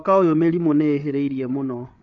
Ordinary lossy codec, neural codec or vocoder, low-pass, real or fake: AAC, 64 kbps; codec, 16 kHz, 8 kbps, FreqCodec, larger model; 7.2 kHz; fake